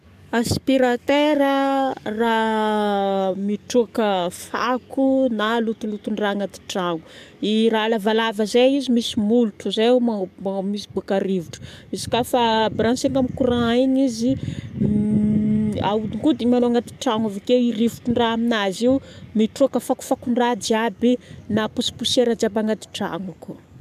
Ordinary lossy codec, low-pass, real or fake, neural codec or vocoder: none; 14.4 kHz; fake; codec, 44.1 kHz, 7.8 kbps, DAC